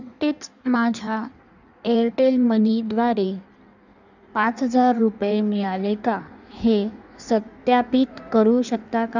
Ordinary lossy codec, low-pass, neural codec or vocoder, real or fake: none; 7.2 kHz; codec, 16 kHz in and 24 kHz out, 1.1 kbps, FireRedTTS-2 codec; fake